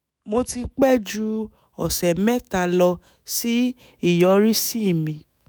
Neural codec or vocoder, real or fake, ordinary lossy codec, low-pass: autoencoder, 48 kHz, 128 numbers a frame, DAC-VAE, trained on Japanese speech; fake; none; none